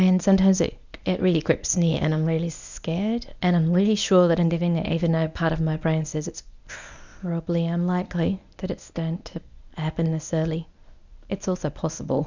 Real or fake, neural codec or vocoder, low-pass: fake; codec, 24 kHz, 0.9 kbps, WavTokenizer, medium speech release version 1; 7.2 kHz